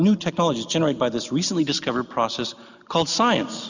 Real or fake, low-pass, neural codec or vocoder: real; 7.2 kHz; none